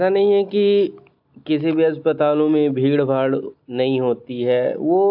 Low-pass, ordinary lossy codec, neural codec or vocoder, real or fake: 5.4 kHz; AAC, 48 kbps; none; real